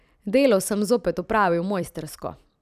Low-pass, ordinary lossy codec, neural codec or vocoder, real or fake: 14.4 kHz; none; vocoder, 44.1 kHz, 128 mel bands every 256 samples, BigVGAN v2; fake